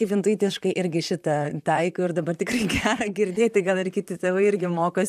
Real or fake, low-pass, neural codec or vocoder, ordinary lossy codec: fake; 14.4 kHz; vocoder, 44.1 kHz, 128 mel bands, Pupu-Vocoder; MP3, 96 kbps